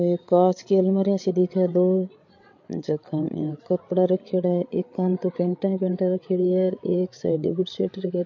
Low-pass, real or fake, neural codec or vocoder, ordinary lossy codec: 7.2 kHz; fake; codec, 16 kHz, 8 kbps, FreqCodec, larger model; MP3, 48 kbps